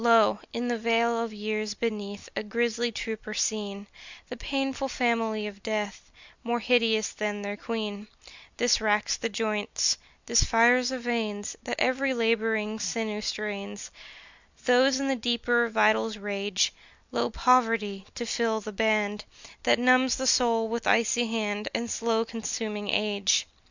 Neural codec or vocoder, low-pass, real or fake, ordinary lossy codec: none; 7.2 kHz; real; Opus, 64 kbps